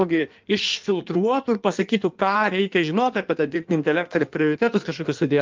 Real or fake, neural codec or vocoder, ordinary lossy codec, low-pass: fake; codec, 16 kHz in and 24 kHz out, 1.1 kbps, FireRedTTS-2 codec; Opus, 32 kbps; 7.2 kHz